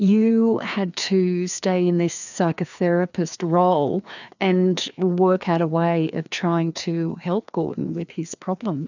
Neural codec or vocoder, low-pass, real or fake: codec, 16 kHz, 2 kbps, FreqCodec, larger model; 7.2 kHz; fake